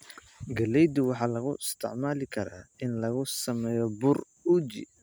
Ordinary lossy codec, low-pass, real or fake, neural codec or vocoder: none; none; real; none